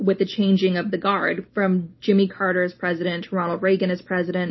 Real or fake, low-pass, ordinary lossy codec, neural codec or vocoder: real; 7.2 kHz; MP3, 24 kbps; none